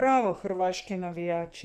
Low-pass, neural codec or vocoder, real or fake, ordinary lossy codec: 14.4 kHz; codec, 44.1 kHz, 2.6 kbps, SNAC; fake; none